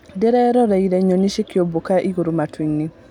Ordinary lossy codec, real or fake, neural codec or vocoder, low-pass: none; real; none; 19.8 kHz